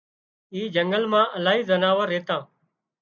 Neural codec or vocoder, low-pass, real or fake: none; 7.2 kHz; real